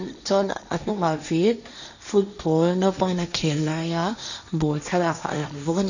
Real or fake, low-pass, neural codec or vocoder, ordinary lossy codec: fake; 7.2 kHz; codec, 16 kHz, 1.1 kbps, Voila-Tokenizer; none